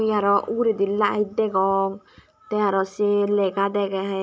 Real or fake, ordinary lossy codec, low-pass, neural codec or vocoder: real; none; none; none